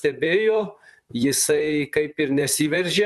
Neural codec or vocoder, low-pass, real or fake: vocoder, 44.1 kHz, 128 mel bands, Pupu-Vocoder; 14.4 kHz; fake